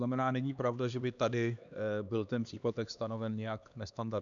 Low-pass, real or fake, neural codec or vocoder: 7.2 kHz; fake; codec, 16 kHz, 2 kbps, X-Codec, HuBERT features, trained on LibriSpeech